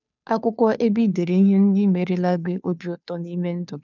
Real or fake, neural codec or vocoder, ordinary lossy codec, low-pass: fake; codec, 16 kHz, 2 kbps, FunCodec, trained on Chinese and English, 25 frames a second; none; 7.2 kHz